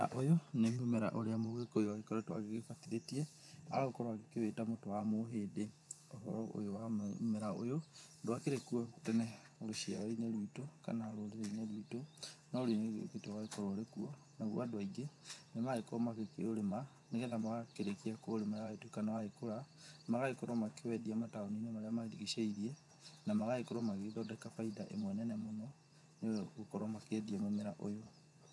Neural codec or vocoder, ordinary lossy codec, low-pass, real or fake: vocoder, 24 kHz, 100 mel bands, Vocos; none; none; fake